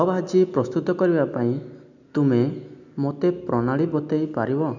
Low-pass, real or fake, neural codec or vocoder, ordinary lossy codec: 7.2 kHz; real; none; none